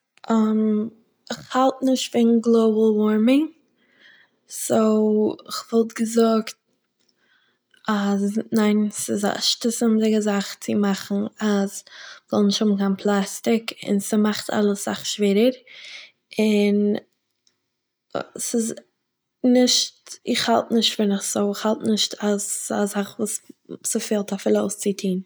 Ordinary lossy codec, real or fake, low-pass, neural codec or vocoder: none; real; none; none